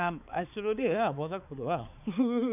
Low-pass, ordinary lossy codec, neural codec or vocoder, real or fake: 3.6 kHz; none; codec, 16 kHz, 4 kbps, X-Codec, HuBERT features, trained on balanced general audio; fake